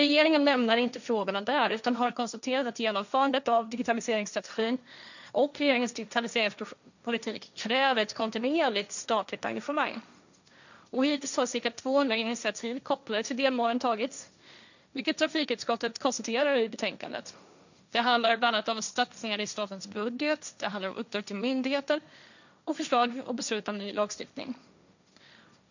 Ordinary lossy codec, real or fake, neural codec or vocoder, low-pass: none; fake; codec, 16 kHz, 1.1 kbps, Voila-Tokenizer; 7.2 kHz